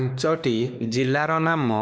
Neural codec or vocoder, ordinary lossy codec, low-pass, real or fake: codec, 16 kHz, 2 kbps, X-Codec, WavLM features, trained on Multilingual LibriSpeech; none; none; fake